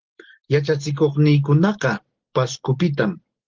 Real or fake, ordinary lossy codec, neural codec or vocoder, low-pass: real; Opus, 16 kbps; none; 7.2 kHz